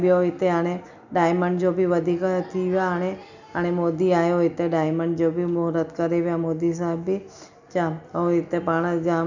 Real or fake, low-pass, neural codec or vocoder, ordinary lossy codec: real; 7.2 kHz; none; none